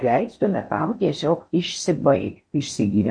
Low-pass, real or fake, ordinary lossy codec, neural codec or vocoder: 9.9 kHz; fake; MP3, 48 kbps; codec, 16 kHz in and 24 kHz out, 0.6 kbps, FocalCodec, streaming, 4096 codes